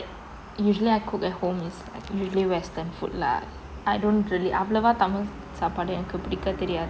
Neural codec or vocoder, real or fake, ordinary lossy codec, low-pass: none; real; none; none